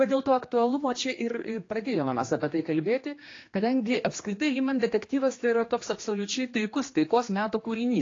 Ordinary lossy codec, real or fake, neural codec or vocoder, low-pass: AAC, 32 kbps; fake; codec, 16 kHz, 2 kbps, X-Codec, HuBERT features, trained on general audio; 7.2 kHz